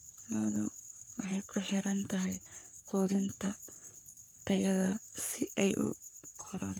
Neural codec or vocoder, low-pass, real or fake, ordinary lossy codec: codec, 44.1 kHz, 3.4 kbps, Pupu-Codec; none; fake; none